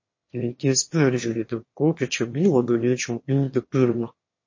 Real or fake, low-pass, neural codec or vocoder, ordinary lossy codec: fake; 7.2 kHz; autoencoder, 22.05 kHz, a latent of 192 numbers a frame, VITS, trained on one speaker; MP3, 32 kbps